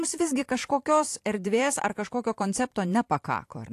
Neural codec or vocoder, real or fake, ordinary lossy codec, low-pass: vocoder, 44.1 kHz, 128 mel bands every 256 samples, BigVGAN v2; fake; AAC, 64 kbps; 14.4 kHz